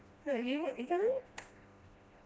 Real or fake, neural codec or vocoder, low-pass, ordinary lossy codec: fake; codec, 16 kHz, 1 kbps, FreqCodec, smaller model; none; none